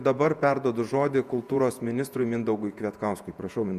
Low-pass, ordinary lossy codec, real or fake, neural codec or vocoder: 14.4 kHz; Opus, 64 kbps; fake; vocoder, 48 kHz, 128 mel bands, Vocos